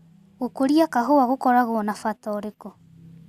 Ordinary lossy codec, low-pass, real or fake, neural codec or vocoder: Opus, 64 kbps; 14.4 kHz; real; none